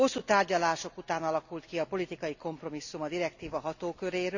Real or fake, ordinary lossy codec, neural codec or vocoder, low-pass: real; none; none; 7.2 kHz